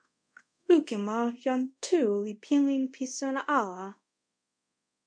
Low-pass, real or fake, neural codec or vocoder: 9.9 kHz; fake; codec, 24 kHz, 0.5 kbps, DualCodec